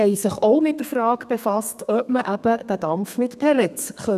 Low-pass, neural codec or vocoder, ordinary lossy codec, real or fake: 14.4 kHz; codec, 32 kHz, 1.9 kbps, SNAC; none; fake